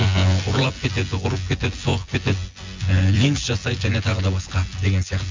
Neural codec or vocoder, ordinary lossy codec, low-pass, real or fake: vocoder, 24 kHz, 100 mel bands, Vocos; MP3, 64 kbps; 7.2 kHz; fake